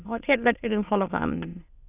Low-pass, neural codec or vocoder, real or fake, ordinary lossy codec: 3.6 kHz; autoencoder, 22.05 kHz, a latent of 192 numbers a frame, VITS, trained on many speakers; fake; none